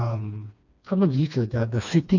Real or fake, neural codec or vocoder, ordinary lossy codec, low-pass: fake; codec, 16 kHz, 2 kbps, FreqCodec, smaller model; none; 7.2 kHz